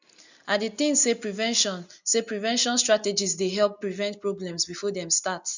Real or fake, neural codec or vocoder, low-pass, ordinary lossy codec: real; none; 7.2 kHz; none